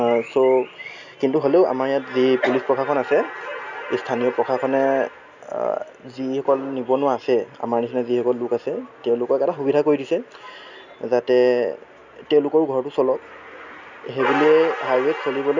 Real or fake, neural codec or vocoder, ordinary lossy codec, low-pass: real; none; none; 7.2 kHz